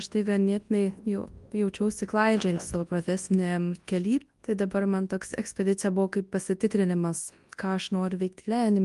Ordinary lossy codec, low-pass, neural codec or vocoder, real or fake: Opus, 32 kbps; 10.8 kHz; codec, 24 kHz, 0.9 kbps, WavTokenizer, large speech release; fake